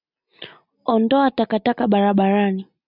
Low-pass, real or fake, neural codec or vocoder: 5.4 kHz; real; none